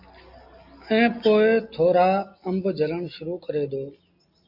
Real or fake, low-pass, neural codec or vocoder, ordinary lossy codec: real; 5.4 kHz; none; AAC, 48 kbps